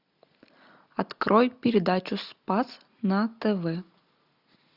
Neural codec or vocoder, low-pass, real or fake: none; 5.4 kHz; real